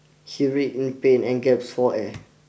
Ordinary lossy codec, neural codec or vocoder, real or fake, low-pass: none; none; real; none